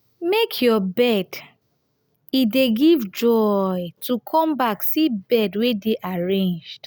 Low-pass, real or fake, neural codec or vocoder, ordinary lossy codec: none; real; none; none